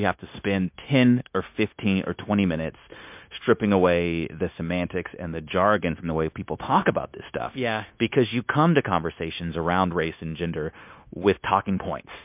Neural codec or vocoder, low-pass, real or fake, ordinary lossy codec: codec, 16 kHz, 0.9 kbps, LongCat-Audio-Codec; 3.6 kHz; fake; MP3, 32 kbps